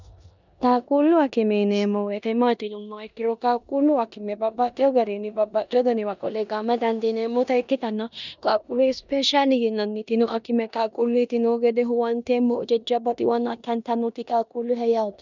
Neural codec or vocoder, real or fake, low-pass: codec, 16 kHz in and 24 kHz out, 0.9 kbps, LongCat-Audio-Codec, four codebook decoder; fake; 7.2 kHz